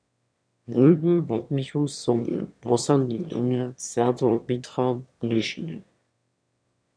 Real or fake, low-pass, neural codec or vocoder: fake; 9.9 kHz; autoencoder, 22.05 kHz, a latent of 192 numbers a frame, VITS, trained on one speaker